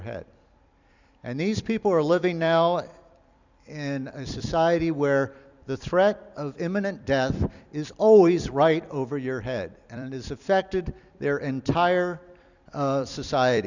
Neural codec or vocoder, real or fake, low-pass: vocoder, 44.1 kHz, 128 mel bands every 256 samples, BigVGAN v2; fake; 7.2 kHz